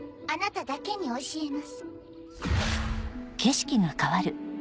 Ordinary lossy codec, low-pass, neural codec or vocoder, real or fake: none; none; none; real